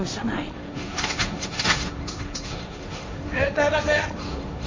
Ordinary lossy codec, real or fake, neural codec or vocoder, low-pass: MP3, 32 kbps; fake; codec, 16 kHz, 1.1 kbps, Voila-Tokenizer; 7.2 kHz